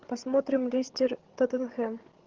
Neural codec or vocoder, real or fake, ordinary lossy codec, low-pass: codec, 16 kHz, 16 kbps, FreqCodec, larger model; fake; Opus, 16 kbps; 7.2 kHz